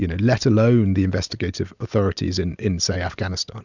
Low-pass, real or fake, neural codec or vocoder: 7.2 kHz; real; none